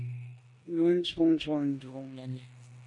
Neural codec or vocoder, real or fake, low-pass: codec, 16 kHz in and 24 kHz out, 0.9 kbps, LongCat-Audio-Codec, four codebook decoder; fake; 10.8 kHz